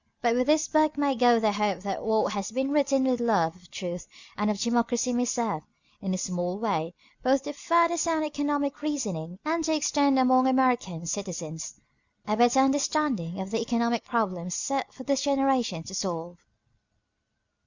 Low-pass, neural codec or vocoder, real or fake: 7.2 kHz; none; real